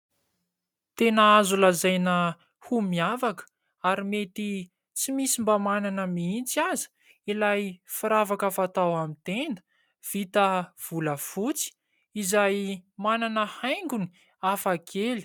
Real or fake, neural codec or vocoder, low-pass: real; none; 19.8 kHz